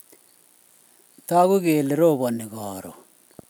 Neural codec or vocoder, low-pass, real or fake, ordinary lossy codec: none; none; real; none